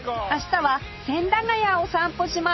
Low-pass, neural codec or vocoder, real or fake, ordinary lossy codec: 7.2 kHz; none; real; MP3, 24 kbps